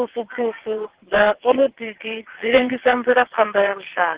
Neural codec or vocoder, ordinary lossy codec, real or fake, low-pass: vocoder, 22.05 kHz, 80 mel bands, WaveNeXt; Opus, 16 kbps; fake; 3.6 kHz